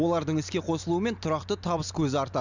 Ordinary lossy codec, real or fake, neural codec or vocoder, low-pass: none; real; none; 7.2 kHz